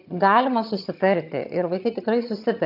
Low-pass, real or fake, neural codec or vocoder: 5.4 kHz; fake; vocoder, 22.05 kHz, 80 mel bands, HiFi-GAN